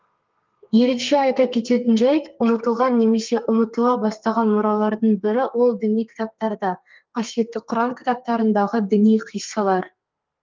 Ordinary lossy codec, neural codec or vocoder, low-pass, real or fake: Opus, 24 kbps; codec, 32 kHz, 1.9 kbps, SNAC; 7.2 kHz; fake